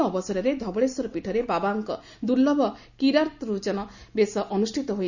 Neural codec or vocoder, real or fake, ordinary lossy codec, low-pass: none; real; none; 7.2 kHz